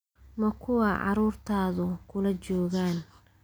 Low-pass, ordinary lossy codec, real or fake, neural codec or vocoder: none; none; real; none